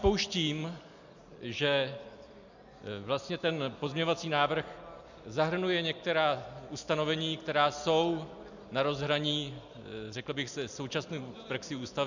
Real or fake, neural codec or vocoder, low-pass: real; none; 7.2 kHz